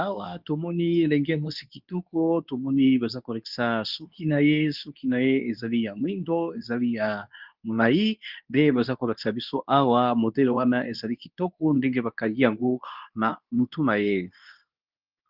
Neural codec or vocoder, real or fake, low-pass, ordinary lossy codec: codec, 24 kHz, 0.9 kbps, WavTokenizer, medium speech release version 2; fake; 5.4 kHz; Opus, 24 kbps